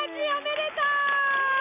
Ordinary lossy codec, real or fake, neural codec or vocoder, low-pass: none; real; none; 3.6 kHz